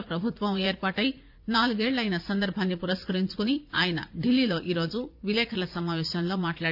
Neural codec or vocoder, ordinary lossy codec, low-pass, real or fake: vocoder, 44.1 kHz, 80 mel bands, Vocos; none; 5.4 kHz; fake